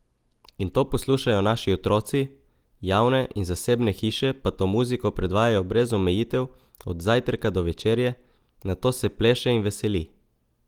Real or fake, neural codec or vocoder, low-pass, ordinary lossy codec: real; none; 19.8 kHz; Opus, 24 kbps